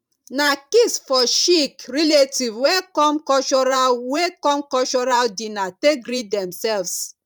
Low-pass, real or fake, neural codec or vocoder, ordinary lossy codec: 19.8 kHz; fake; vocoder, 44.1 kHz, 128 mel bands every 512 samples, BigVGAN v2; none